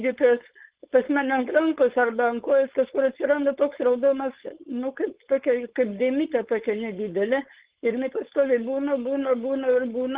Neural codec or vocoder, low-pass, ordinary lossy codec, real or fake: codec, 16 kHz, 4.8 kbps, FACodec; 3.6 kHz; Opus, 16 kbps; fake